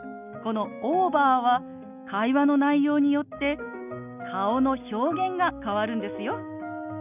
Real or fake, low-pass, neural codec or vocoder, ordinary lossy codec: real; 3.6 kHz; none; none